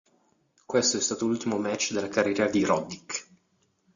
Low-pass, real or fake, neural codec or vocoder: 7.2 kHz; real; none